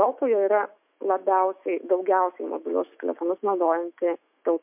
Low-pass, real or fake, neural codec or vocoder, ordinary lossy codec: 3.6 kHz; fake; autoencoder, 48 kHz, 128 numbers a frame, DAC-VAE, trained on Japanese speech; MP3, 32 kbps